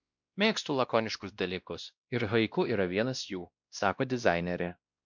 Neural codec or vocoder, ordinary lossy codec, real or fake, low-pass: codec, 16 kHz, 1 kbps, X-Codec, WavLM features, trained on Multilingual LibriSpeech; MP3, 64 kbps; fake; 7.2 kHz